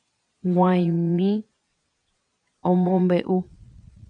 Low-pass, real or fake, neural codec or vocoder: 9.9 kHz; fake; vocoder, 22.05 kHz, 80 mel bands, Vocos